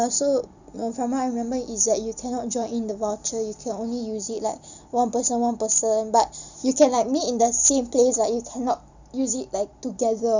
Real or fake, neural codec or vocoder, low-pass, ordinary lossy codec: real; none; 7.2 kHz; none